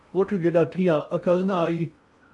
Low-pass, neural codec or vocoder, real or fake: 10.8 kHz; codec, 16 kHz in and 24 kHz out, 0.6 kbps, FocalCodec, streaming, 2048 codes; fake